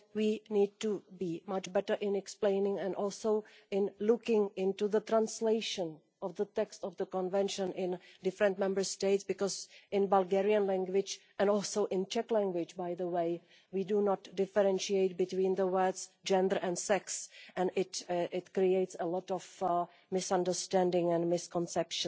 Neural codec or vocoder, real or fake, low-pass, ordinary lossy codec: none; real; none; none